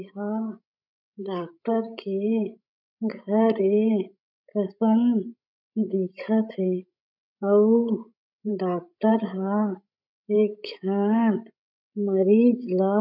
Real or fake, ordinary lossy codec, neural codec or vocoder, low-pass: fake; none; codec, 16 kHz, 16 kbps, FreqCodec, larger model; 5.4 kHz